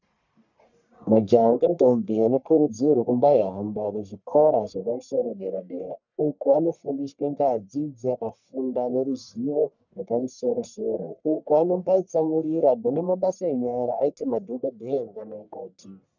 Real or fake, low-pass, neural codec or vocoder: fake; 7.2 kHz; codec, 44.1 kHz, 1.7 kbps, Pupu-Codec